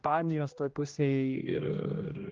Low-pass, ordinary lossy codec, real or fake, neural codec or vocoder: 7.2 kHz; Opus, 32 kbps; fake; codec, 16 kHz, 1 kbps, X-Codec, HuBERT features, trained on general audio